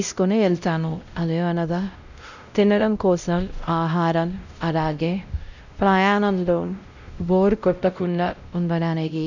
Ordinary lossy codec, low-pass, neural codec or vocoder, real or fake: none; 7.2 kHz; codec, 16 kHz, 0.5 kbps, X-Codec, WavLM features, trained on Multilingual LibriSpeech; fake